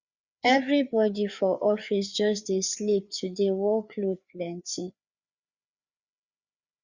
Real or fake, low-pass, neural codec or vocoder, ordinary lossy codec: fake; 7.2 kHz; codec, 16 kHz, 4 kbps, FreqCodec, larger model; Opus, 64 kbps